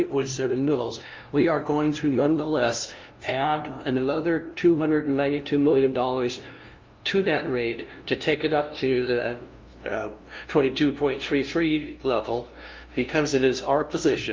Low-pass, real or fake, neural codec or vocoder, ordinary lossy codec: 7.2 kHz; fake; codec, 16 kHz, 0.5 kbps, FunCodec, trained on LibriTTS, 25 frames a second; Opus, 24 kbps